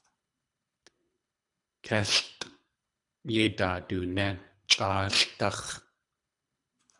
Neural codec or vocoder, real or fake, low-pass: codec, 24 kHz, 3 kbps, HILCodec; fake; 10.8 kHz